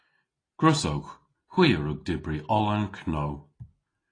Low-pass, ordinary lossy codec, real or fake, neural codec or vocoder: 9.9 kHz; AAC, 32 kbps; real; none